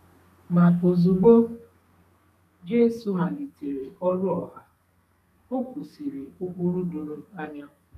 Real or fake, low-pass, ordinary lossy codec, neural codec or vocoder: fake; 14.4 kHz; none; codec, 32 kHz, 1.9 kbps, SNAC